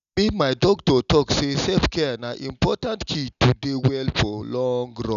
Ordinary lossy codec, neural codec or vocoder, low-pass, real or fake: none; none; 7.2 kHz; real